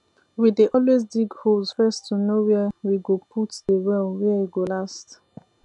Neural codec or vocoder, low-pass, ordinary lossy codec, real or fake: none; 10.8 kHz; none; real